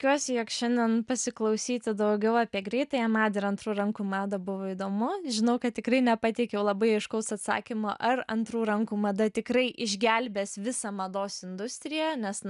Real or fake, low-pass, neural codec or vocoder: real; 10.8 kHz; none